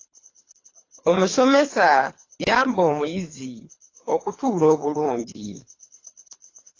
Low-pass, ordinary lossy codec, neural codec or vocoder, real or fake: 7.2 kHz; AAC, 32 kbps; codec, 24 kHz, 3 kbps, HILCodec; fake